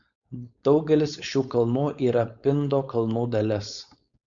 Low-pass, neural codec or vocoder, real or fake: 7.2 kHz; codec, 16 kHz, 4.8 kbps, FACodec; fake